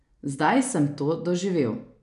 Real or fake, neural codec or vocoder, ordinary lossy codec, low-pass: real; none; none; 10.8 kHz